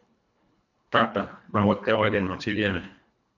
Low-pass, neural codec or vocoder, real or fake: 7.2 kHz; codec, 24 kHz, 1.5 kbps, HILCodec; fake